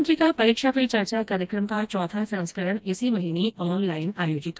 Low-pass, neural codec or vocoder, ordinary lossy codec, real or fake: none; codec, 16 kHz, 1 kbps, FreqCodec, smaller model; none; fake